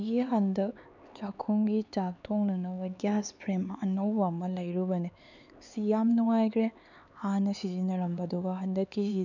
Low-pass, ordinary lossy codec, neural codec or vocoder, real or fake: 7.2 kHz; none; codec, 16 kHz, 4 kbps, X-Codec, HuBERT features, trained on LibriSpeech; fake